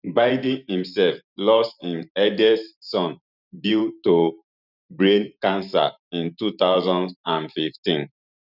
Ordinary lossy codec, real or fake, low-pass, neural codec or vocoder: none; fake; 5.4 kHz; vocoder, 44.1 kHz, 128 mel bands every 512 samples, BigVGAN v2